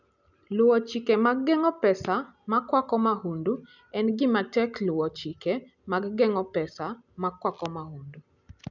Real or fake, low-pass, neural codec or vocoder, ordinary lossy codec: real; 7.2 kHz; none; none